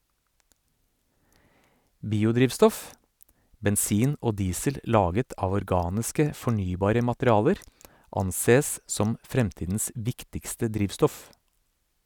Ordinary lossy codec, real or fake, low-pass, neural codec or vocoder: none; real; none; none